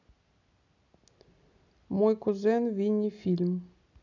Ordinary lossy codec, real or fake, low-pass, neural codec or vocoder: none; real; 7.2 kHz; none